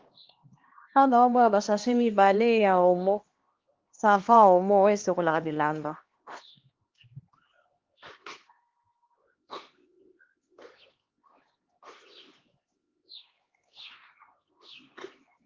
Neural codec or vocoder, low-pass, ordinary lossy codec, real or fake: codec, 16 kHz, 2 kbps, X-Codec, HuBERT features, trained on LibriSpeech; 7.2 kHz; Opus, 16 kbps; fake